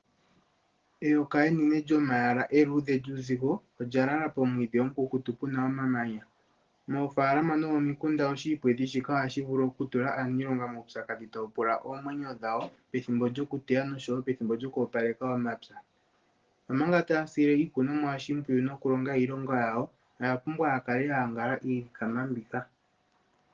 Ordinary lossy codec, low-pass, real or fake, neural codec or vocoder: Opus, 16 kbps; 7.2 kHz; real; none